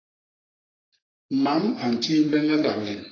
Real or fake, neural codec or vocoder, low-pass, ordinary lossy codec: fake; codec, 44.1 kHz, 3.4 kbps, Pupu-Codec; 7.2 kHz; AAC, 32 kbps